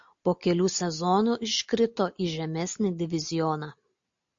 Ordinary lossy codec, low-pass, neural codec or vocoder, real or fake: AAC, 64 kbps; 7.2 kHz; none; real